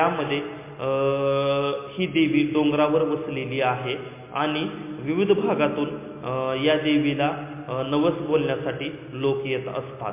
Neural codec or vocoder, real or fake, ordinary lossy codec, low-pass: none; real; MP3, 24 kbps; 3.6 kHz